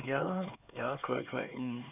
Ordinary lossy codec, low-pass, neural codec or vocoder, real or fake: none; 3.6 kHz; codec, 16 kHz, 4 kbps, X-Codec, HuBERT features, trained on LibriSpeech; fake